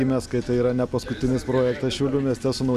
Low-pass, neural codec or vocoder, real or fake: 14.4 kHz; none; real